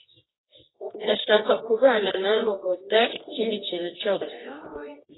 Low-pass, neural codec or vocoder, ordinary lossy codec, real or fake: 7.2 kHz; codec, 24 kHz, 0.9 kbps, WavTokenizer, medium music audio release; AAC, 16 kbps; fake